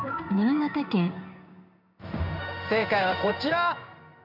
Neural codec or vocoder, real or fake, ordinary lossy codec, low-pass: codec, 16 kHz in and 24 kHz out, 1 kbps, XY-Tokenizer; fake; none; 5.4 kHz